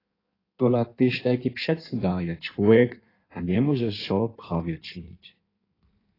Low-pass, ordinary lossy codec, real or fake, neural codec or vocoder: 5.4 kHz; AAC, 24 kbps; fake; codec, 16 kHz in and 24 kHz out, 1.1 kbps, FireRedTTS-2 codec